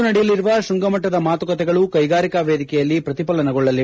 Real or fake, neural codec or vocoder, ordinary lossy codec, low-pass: real; none; none; none